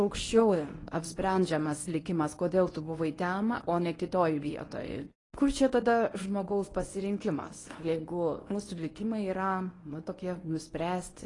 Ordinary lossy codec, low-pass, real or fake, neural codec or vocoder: AAC, 32 kbps; 10.8 kHz; fake; codec, 24 kHz, 0.9 kbps, WavTokenizer, medium speech release version 1